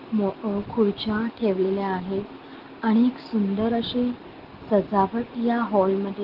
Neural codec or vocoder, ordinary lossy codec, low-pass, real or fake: none; Opus, 16 kbps; 5.4 kHz; real